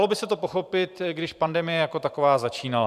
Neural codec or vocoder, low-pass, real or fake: vocoder, 44.1 kHz, 128 mel bands every 512 samples, BigVGAN v2; 14.4 kHz; fake